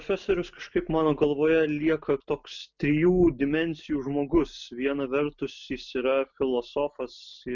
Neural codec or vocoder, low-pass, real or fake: none; 7.2 kHz; real